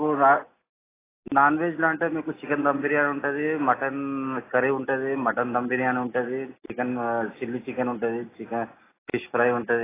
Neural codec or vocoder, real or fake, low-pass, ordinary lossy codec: none; real; 3.6 kHz; AAC, 16 kbps